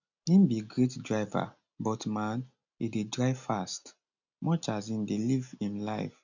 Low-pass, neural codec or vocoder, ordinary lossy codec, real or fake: 7.2 kHz; none; none; real